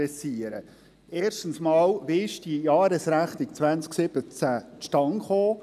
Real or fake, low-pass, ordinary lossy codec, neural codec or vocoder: real; 14.4 kHz; none; none